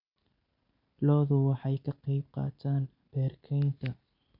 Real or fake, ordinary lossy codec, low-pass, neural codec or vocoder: real; none; 5.4 kHz; none